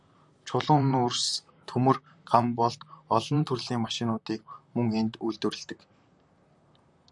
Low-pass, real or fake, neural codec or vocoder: 10.8 kHz; fake; vocoder, 44.1 kHz, 128 mel bands, Pupu-Vocoder